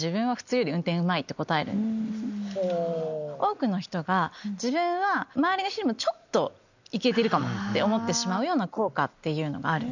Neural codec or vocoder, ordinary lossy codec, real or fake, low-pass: none; none; real; 7.2 kHz